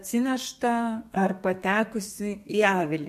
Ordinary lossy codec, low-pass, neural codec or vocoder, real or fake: MP3, 64 kbps; 14.4 kHz; codec, 32 kHz, 1.9 kbps, SNAC; fake